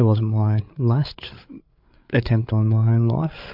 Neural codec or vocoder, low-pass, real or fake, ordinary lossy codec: codec, 16 kHz, 8 kbps, FreqCodec, larger model; 5.4 kHz; fake; MP3, 48 kbps